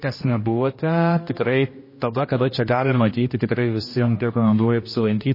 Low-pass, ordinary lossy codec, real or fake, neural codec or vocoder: 5.4 kHz; MP3, 24 kbps; fake; codec, 16 kHz, 1 kbps, X-Codec, HuBERT features, trained on general audio